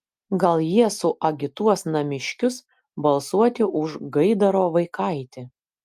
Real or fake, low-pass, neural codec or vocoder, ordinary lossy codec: real; 14.4 kHz; none; Opus, 32 kbps